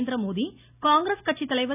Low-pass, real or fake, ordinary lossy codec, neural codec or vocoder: 3.6 kHz; real; none; none